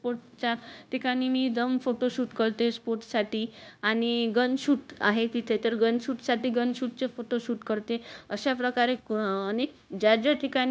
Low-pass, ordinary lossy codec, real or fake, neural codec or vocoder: none; none; fake; codec, 16 kHz, 0.9 kbps, LongCat-Audio-Codec